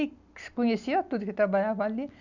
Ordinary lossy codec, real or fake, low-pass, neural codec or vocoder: none; real; 7.2 kHz; none